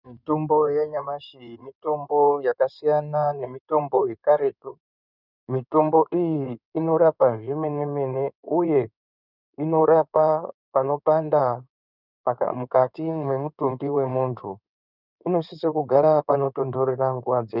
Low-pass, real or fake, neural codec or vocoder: 5.4 kHz; fake; codec, 16 kHz in and 24 kHz out, 2.2 kbps, FireRedTTS-2 codec